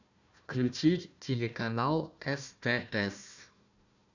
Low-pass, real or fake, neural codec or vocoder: 7.2 kHz; fake; codec, 16 kHz, 1 kbps, FunCodec, trained on Chinese and English, 50 frames a second